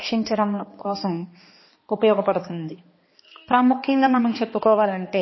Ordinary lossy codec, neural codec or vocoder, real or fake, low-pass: MP3, 24 kbps; codec, 16 kHz, 2 kbps, X-Codec, HuBERT features, trained on balanced general audio; fake; 7.2 kHz